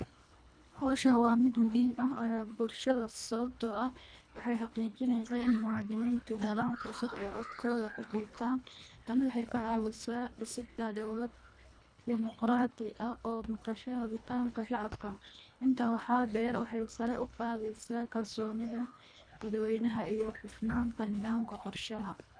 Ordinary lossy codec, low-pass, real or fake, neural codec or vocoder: none; 9.9 kHz; fake; codec, 24 kHz, 1.5 kbps, HILCodec